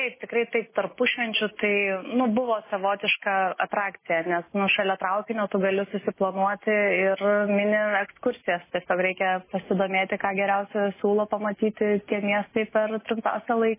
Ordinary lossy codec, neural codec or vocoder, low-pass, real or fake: MP3, 16 kbps; none; 3.6 kHz; real